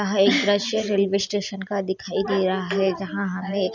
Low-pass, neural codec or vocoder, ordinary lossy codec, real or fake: 7.2 kHz; none; none; real